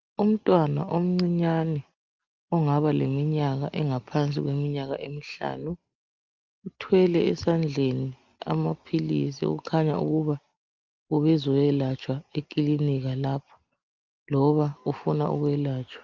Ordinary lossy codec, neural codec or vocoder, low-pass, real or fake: Opus, 32 kbps; none; 7.2 kHz; real